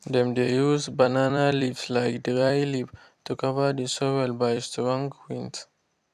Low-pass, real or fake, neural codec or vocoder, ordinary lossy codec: 14.4 kHz; fake; vocoder, 44.1 kHz, 128 mel bands every 256 samples, BigVGAN v2; none